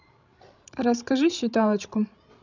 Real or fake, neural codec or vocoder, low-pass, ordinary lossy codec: fake; codec, 16 kHz, 16 kbps, FreqCodec, larger model; 7.2 kHz; none